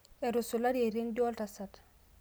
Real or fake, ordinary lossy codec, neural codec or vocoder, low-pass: real; none; none; none